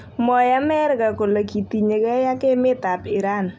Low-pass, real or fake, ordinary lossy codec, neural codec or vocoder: none; real; none; none